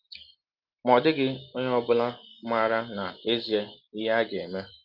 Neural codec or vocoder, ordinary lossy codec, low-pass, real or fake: none; Opus, 24 kbps; 5.4 kHz; real